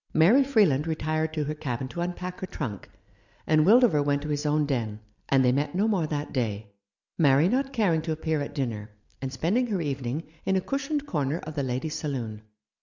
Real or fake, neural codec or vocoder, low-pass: real; none; 7.2 kHz